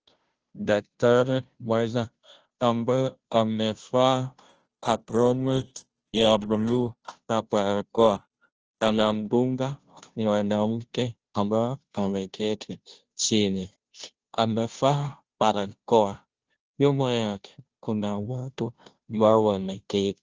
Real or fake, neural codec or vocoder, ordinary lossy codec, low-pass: fake; codec, 16 kHz, 0.5 kbps, FunCodec, trained on Chinese and English, 25 frames a second; Opus, 16 kbps; 7.2 kHz